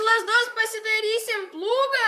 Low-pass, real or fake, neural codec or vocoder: 14.4 kHz; fake; vocoder, 44.1 kHz, 128 mel bands, Pupu-Vocoder